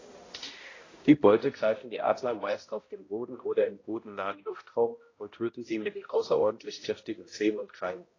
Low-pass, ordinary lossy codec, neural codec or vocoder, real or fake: 7.2 kHz; AAC, 32 kbps; codec, 16 kHz, 0.5 kbps, X-Codec, HuBERT features, trained on balanced general audio; fake